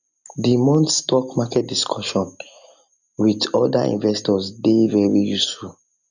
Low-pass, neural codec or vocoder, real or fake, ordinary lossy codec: 7.2 kHz; none; real; none